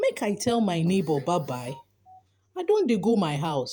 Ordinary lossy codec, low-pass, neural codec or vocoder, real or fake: none; none; vocoder, 48 kHz, 128 mel bands, Vocos; fake